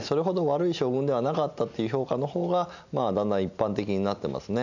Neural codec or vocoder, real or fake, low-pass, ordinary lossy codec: none; real; 7.2 kHz; none